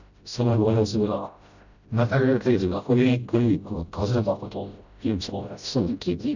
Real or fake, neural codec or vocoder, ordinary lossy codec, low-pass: fake; codec, 16 kHz, 0.5 kbps, FreqCodec, smaller model; none; 7.2 kHz